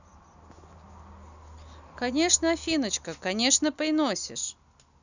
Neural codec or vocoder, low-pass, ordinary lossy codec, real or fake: none; 7.2 kHz; none; real